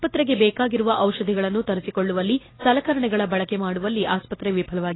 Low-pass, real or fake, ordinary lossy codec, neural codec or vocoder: 7.2 kHz; real; AAC, 16 kbps; none